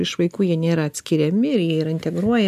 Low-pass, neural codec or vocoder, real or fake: 14.4 kHz; none; real